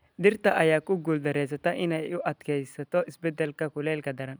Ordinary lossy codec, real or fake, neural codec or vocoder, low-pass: none; real; none; none